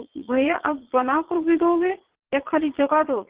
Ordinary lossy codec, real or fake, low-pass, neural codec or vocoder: Opus, 16 kbps; fake; 3.6 kHz; vocoder, 22.05 kHz, 80 mel bands, Vocos